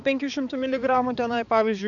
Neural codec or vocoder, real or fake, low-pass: codec, 16 kHz, 4 kbps, X-Codec, HuBERT features, trained on balanced general audio; fake; 7.2 kHz